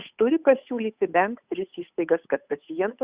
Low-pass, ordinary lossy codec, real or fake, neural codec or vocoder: 3.6 kHz; Opus, 64 kbps; fake; codec, 16 kHz, 8 kbps, FunCodec, trained on Chinese and English, 25 frames a second